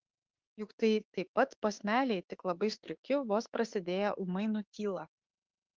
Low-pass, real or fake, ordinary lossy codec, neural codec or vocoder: 7.2 kHz; fake; Opus, 16 kbps; autoencoder, 48 kHz, 32 numbers a frame, DAC-VAE, trained on Japanese speech